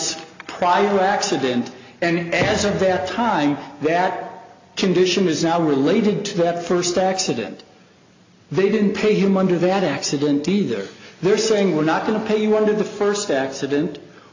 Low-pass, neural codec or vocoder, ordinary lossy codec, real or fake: 7.2 kHz; none; AAC, 48 kbps; real